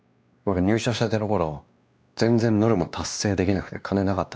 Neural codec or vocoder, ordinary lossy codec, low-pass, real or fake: codec, 16 kHz, 2 kbps, X-Codec, WavLM features, trained on Multilingual LibriSpeech; none; none; fake